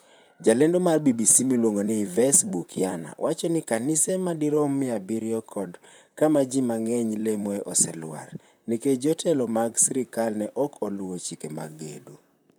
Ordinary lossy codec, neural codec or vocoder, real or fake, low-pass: none; vocoder, 44.1 kHz, 128 mel bands, Pupu-Vocoder; fake; none